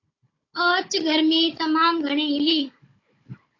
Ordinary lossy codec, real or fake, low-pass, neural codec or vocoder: AAC, 32 kbps; fake; 7.2 kHz; codec, 16 kHz, 16 kbps, FunCodec, trained on Chinese and English, 50 frames a second